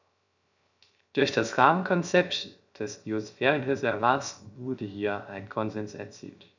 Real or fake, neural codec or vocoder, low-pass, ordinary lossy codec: fake; codec, 16 kHz, 0.3 kbps, FocalCodec; 7.2 kHz; none